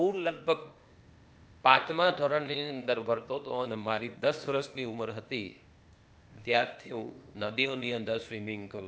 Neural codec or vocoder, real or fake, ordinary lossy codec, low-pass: codec, 16 kHz, 0.8 kbps, ZipCodec; fake; none; none